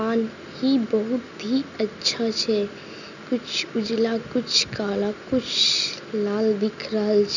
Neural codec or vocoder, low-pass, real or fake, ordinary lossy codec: none; 7.2 kHz; real; none